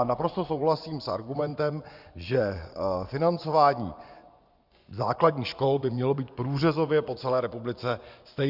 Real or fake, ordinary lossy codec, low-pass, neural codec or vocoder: fake; Opus, 64 kbps; 5.4 kHz; vocoder, 24 kHz, 100 mel bands, Vocos